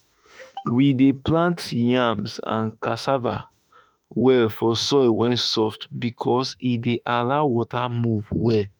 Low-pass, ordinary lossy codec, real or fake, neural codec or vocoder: none; none; fake; autoencoder, 48 kHz, 32 numbers a frame, DAC-VAE, trained on Japanese speech